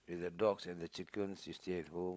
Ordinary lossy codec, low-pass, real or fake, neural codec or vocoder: none; none; fake; codec, 16 kHz, 16 kbps, FunCodec, trained on LibriTTS, 50 frames a second